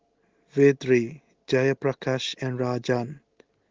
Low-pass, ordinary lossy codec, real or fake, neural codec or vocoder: 7.2 kHz; Opus, 16 kbps; real; none